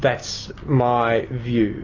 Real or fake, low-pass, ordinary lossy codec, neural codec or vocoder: real; 7.2 kHz; AAC, 32 kbps; none